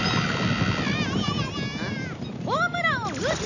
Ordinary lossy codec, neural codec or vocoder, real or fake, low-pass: none; none; real; 7.2 kHz